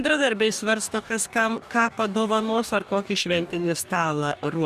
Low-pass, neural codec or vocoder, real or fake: 14.4 kHz; codec, 44.1 kHz, 2.6 kbps, DAC; fake